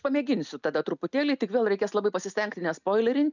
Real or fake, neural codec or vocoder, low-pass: real; none; 7.2 kHz